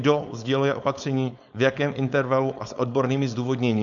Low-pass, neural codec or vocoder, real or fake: 7.2 kHz; codec, 16 kHz, 4.8 kbps, FACodec; fake